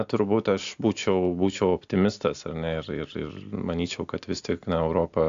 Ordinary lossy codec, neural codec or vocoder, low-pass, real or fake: AAC, 64 kbps; none; 7.2 kHz; real